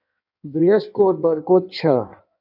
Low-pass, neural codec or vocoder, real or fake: 5.4 kHz; codec, 16 kHz in and 24 kHz out, 1.1 kbps, FireRedTTS-2 codec; fake